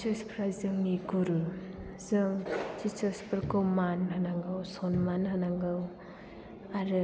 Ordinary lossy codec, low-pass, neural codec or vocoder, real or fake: none; none; none; real